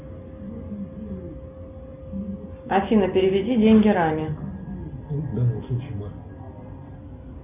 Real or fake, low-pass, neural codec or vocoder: real; 3.6 kHz; none